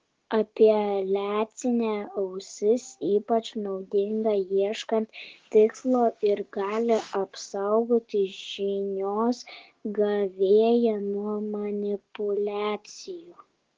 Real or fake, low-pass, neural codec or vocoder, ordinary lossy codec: fake; 7.2 kHz; codec, 16 kHz, 6 kbps, DAC; Opus, 16 kbps